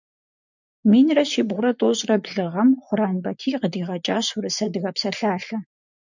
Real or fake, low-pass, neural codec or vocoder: fake; 7.2 kHz; vocoder, 24 kHz, 100 mel bands, Vocos